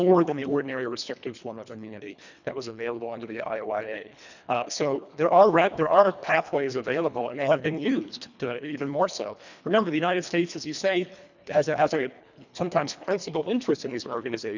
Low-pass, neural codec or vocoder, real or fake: 7.2 kHz; codec, 24 kHz, 1.5 kbps, HILCodec; fake